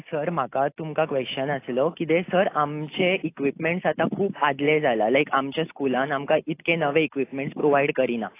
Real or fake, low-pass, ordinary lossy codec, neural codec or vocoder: real; 3.6 kHz; AAC, 24 kbps; none